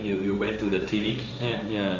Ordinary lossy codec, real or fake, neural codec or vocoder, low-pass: none; fake; codec, 16 kHz, 1.1 kbps, Voila-Tokenizer; 7.2 kHz